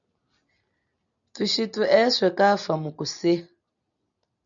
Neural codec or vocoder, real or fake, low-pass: none; real; 7.2 kHz